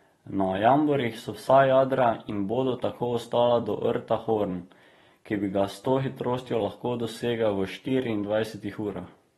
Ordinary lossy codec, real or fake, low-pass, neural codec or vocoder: AAC, 32 kbps; fake; 19.8 kHz; vocoder, 44.1 kHz, 128 mel bands every 256 samples, BigVGAN v2